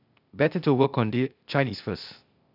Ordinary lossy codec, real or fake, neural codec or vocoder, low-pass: none; fake; codec, 16 kHz, 0.8 kbps, ZipCodec; 5.4 kHz